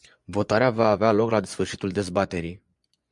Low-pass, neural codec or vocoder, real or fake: 10.8 kHz; vocoder, 24 kHz, 100 mel bands, Vocos; fake